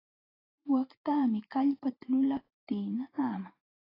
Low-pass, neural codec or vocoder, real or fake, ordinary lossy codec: 5.4 kHz; vocoder, 44.1 kHz, 128 mel bands every 512 samples, BigVGAN v2; fake; AAC, 24 kbps